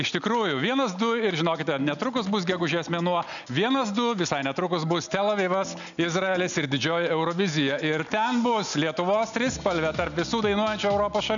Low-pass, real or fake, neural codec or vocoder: 7.2 kHz; real; none